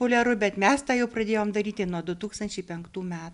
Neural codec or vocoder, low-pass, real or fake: none; 10.8 kHz; real